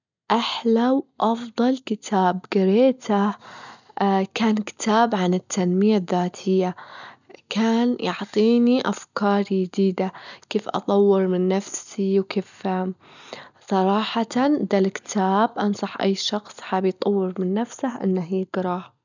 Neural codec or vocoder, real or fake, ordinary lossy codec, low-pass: none; real; none; 7.2 kHz